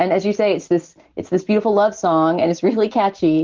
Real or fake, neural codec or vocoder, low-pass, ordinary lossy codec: real; none; 7.2 kHz; Opus, 16 kbps